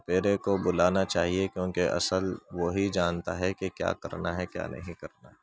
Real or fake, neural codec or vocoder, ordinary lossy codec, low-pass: real; none; none; none